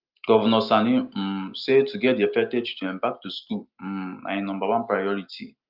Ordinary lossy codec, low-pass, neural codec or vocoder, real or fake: Opus, 32 kbps; 5.4 kHz; none; real